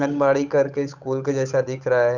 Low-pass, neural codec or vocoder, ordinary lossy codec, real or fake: 7.2 kHz; codec, 16 kHz, 8 kbps, FunCodec, trained on Chinese and English, 25 frames a second; none; fake